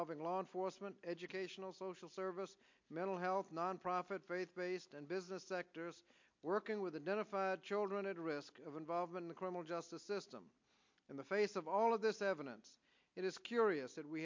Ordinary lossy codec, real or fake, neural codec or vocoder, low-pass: MP3, 48 kbps; real; none; 7.2 kHz